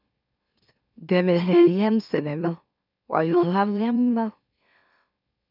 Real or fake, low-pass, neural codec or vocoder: fake; 5.4 kHz; autoencoder, 44.1 kHz, a latent of 192 numbers a frame, MeloTTS